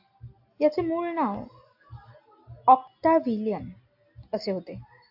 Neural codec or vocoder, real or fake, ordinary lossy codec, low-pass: none; real; AAC, 48 kbps; 5.4 kHz